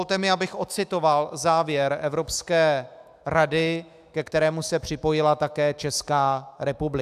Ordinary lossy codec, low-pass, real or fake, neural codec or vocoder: AAC, 96 kbps; 14.4 kHz; fake; autoencoder, 48 kHz, 128 numbers a frame, DAC-VAE, trained on Japanese speech